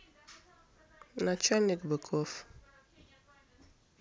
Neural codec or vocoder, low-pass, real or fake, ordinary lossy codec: none; none; real; none